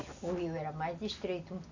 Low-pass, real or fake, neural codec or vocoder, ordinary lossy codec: 7.2 kHz; real; none; Opus, 64 kbps